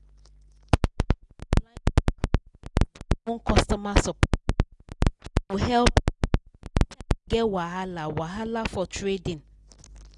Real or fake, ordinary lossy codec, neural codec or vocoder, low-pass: real; none; none; 10.8 kHz